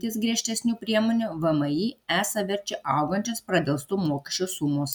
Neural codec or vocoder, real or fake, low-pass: none; real; 19.8 kHz